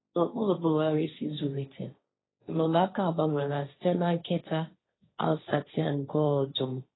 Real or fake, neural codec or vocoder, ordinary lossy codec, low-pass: fake; codec, 16 kHz, 1.1 kbps, Voila-Tokenizer; AAC, 16 kbps; 7.2 kHz